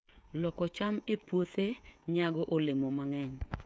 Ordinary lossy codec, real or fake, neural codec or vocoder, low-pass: none; fake; codec, 16 kHz, 16 kbps, FreqCodec, smaller model; none